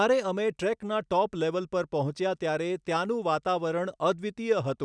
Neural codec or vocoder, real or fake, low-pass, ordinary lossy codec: none; real; 9.9 kHz; none